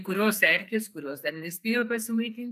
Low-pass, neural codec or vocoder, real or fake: 14.4 kHz; codec, 44.1 kHz, 2.6 kbps, SNAC; fake